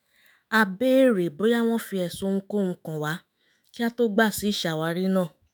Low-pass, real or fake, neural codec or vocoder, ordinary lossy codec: none; fake; autoencoder, 48 kHz, 128 numbers a frame, DAC-VAE, trained on Japanese speech; none